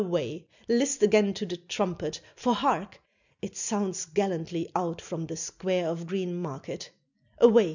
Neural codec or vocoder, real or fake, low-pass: none; real; 7.2 kHz